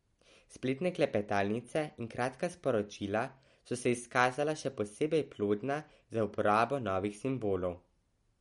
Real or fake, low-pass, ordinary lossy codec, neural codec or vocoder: real; 19.8 kHz; MP3, 48 kbps; none